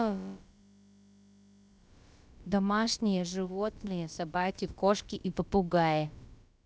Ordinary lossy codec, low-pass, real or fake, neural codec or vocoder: none; none; fake; codec, 16 kHz, about 1 kbps, DyCAST, with the encoder's durations